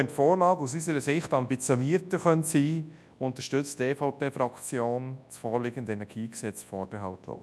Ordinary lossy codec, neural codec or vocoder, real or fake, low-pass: none; codec, 24 kHz, 0.9 kbps, WavTokenizer, large speech release; fake; none